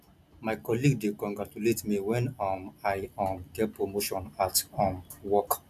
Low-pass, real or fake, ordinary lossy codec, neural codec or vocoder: 14.4 kHz; real; none; none